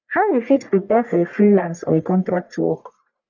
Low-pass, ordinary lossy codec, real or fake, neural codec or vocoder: 7.2 kHz; none; fake; codec, 44.1 kHz, 1.7 kbps, Pupu-Codec